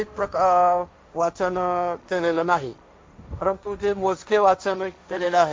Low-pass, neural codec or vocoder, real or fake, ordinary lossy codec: none; codec, 16 kHz, 1.1 kbps, Voila-Tokenizer; fake; none